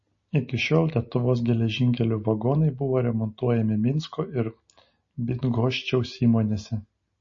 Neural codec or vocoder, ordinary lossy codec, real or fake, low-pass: none; MP3, 32 kbps; real; 7.2 kHz